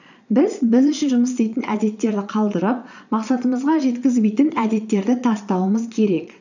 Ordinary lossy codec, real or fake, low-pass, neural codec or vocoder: none; fake; 7.2 kHz; vocoder, 22.05 kHz, 80 mel bands, Vocos